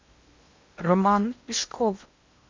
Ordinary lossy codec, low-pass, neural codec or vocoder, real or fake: MP3, 64 kbps; 7.2 kHz; codec, 16 kHz in and 24 kHz out, 0.8 kbps, FocalCodec, streaming, 65536 codes; fake